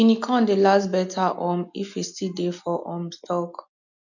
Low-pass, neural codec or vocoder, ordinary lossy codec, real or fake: 7.2 kHz; none; none; real